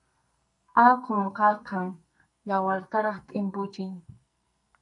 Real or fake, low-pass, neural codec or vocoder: fake; 10.8 kHz; codec, 44.1 kHz, 2.6 kbps, SNAC